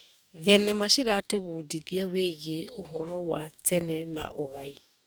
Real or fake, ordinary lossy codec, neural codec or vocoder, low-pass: fake; none; codec, 44.1 kHz, 2.6 kbps, DAC; none